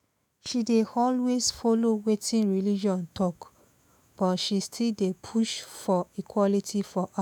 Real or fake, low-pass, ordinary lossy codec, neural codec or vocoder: fake; none; none; autoencoder, 48 kHz, 128 numbers a frame, DAC-VAE, trained on Japanese speech